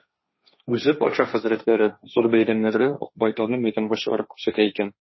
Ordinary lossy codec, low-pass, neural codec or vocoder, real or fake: MP3, 24 kbps; 7.2 kHz; codec, 16 kHz, 1.1 kbps, Voila-Tokenizer; fake